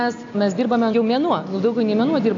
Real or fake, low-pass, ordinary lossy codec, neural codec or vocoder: real; 7.2 kHz; AAC, 48 kbps; none